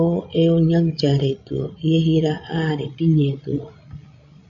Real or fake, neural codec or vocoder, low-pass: fake; codec, 16 kHz, 16 kbps, FreqCodec, larger model; 7.2 kHz